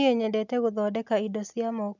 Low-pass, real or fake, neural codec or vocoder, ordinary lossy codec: 7.2 kHz; real; none; none